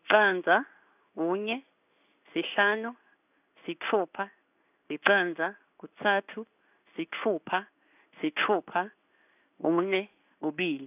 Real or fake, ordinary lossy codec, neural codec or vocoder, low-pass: fake; none; codec, 16 kHz in and 24 kHz out, 1 kbps, XY-Tokenizer; 3.6 kHz